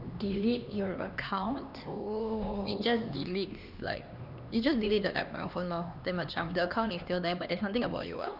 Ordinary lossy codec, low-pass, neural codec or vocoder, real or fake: none; 5.4 kHz; codec, 16 kHz, 2 kbps, X-Codec, HuBERT features, trained on LibriSpeech; fake